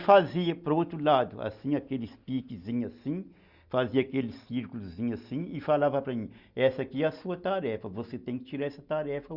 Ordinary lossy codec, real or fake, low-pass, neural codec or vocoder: Opus, 64 kbps; real; 5.4 kHz; none